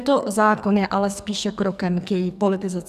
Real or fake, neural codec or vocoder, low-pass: fake; codec, 44.1 kHz, 2.6 kbps, SNAC; 14.4 kHz